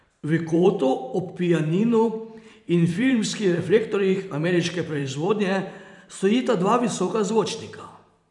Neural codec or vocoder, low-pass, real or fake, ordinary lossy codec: vocoder, 44.1 kHz, 128 mel bands every 512 samples, BigVGAN v2; 10.8 kHz; fake; none